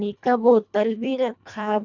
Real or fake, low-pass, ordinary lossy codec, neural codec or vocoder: fake; 7.2 kHz; none; codec, 24 kHz, 1.5 kbps, HILCodec